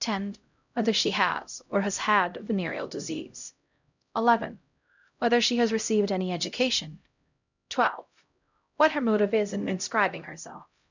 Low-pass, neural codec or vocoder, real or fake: 7.2 kHz; codec, 16 kHz, 0.5 kbps, X-Codec, HuBERT features, trained on LibriSpeech; fake